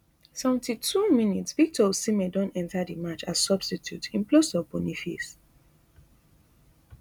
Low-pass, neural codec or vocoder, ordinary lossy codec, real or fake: none; none; none; real